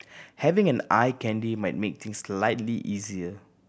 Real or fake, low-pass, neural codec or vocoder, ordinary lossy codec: real; none; none; none